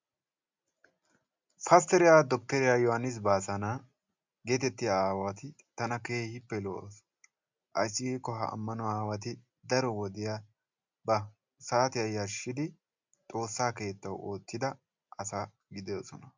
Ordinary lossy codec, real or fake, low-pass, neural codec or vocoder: MP3, 64 kbps; real; 7.2 kHz; none